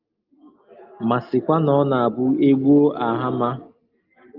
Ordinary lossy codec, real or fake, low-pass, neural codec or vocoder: Opus, 32 kbps; real; 5.4 kHz; none